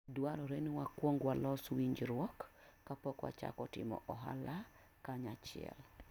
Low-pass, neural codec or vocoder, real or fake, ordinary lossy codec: 19.8 kHz; none; real; none